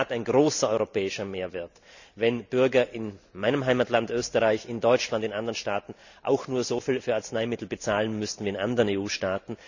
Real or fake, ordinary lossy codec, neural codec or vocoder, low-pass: real; none; none; 7.2 kHz